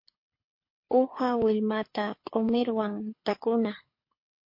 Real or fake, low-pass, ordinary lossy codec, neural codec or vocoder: fake; 5.4 kHz; MP3, 32 kbps; codec, 24 kHz, 6 kbps, HILCodec